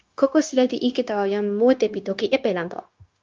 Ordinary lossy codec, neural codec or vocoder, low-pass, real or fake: Opus, 24 kbps; codec, 16 kHz, 0.9 kbps, LongCat-Audio-Codec; 7.2 kHz; fake